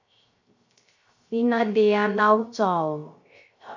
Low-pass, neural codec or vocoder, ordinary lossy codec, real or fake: 7.2 kHz; codec, 16 kHz, 0.3 kbps, FocalCodec; MP3, 48 kbps; fake